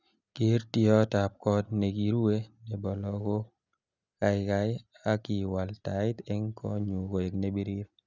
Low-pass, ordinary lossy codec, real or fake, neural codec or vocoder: 7.2 kHz; none; real; none